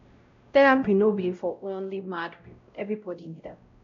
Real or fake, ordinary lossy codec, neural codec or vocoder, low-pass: fake; AAC, 48 kbps; codec, 16 kHz, 0.5 kbps, X-Codec, WavLM features, trained on Multilingual LibriSpeech; 7.2 kHz